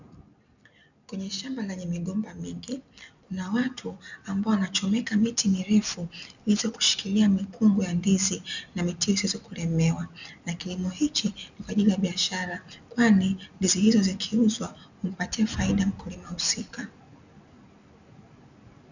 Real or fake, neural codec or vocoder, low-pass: real; none; 7.2 kHz